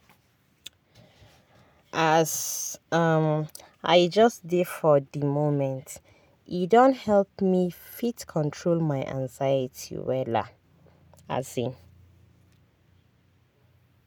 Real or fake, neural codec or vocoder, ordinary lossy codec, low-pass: real; none; none; none